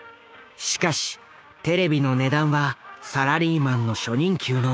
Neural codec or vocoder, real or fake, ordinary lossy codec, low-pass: codec, 16 kHz, 6 kbps, DAC; fake; none; none